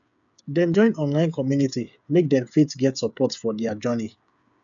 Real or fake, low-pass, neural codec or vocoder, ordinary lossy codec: fake; 7.2 kHz; codec, 16 kHz, 8 kbps, FreqCodec, smaller model; none